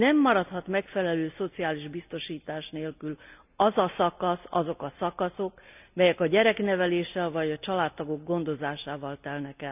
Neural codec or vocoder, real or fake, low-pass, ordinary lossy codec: none; real; 3.6 kHz; none